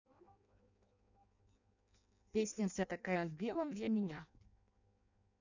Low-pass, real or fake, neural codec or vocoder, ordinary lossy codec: 7.2 kHz; fake; codec, 16 kHz in and 24 kHz out, 0.6 kbps, FireRedTTS-2 codec; none